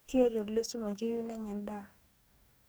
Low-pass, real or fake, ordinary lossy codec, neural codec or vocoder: none; fake; none; codec, 44.1 kHz, 2.6 kbps, DAC